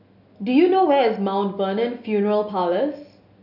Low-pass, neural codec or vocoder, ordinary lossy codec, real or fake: 5.4 kHz; none; none; real